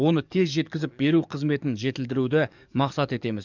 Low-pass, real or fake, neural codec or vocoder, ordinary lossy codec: 7.2 kHz; fake; codec, 44.1 kHz, 7.8 kbps, DAC; none